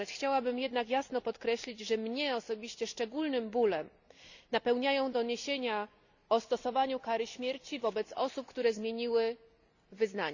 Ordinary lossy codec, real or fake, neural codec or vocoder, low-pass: none; real; none; 7.2 kHz